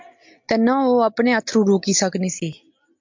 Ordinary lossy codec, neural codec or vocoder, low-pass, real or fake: MP3, 64 kbps; none; 7.2 kHz; real